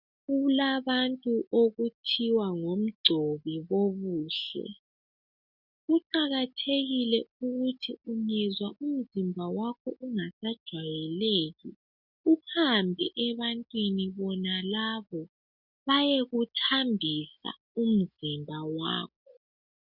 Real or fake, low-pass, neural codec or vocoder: real; 5.4 kHz; none